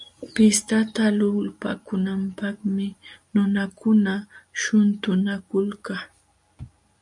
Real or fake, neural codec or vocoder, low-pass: real; none; 10.8 kHz